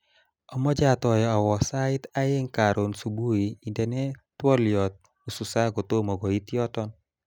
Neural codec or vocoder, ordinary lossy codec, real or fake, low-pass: none; none; real; none